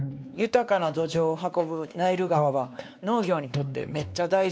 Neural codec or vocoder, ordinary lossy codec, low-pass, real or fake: codec, 16 kHz, 2 kbps, X-Codec, WavLM features, trained on Multilingual LibriSpeech; none; none; fake